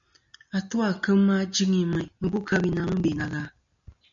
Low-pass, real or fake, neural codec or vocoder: 7.2 kHz; real; none